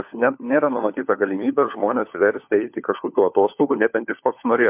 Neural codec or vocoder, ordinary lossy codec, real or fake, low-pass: codec, 16 kHz, 4 kbps, FunCodec, trained on LibriTTS, 50 frames a second; MP3, 32 kbps; fake; 3.6 kHz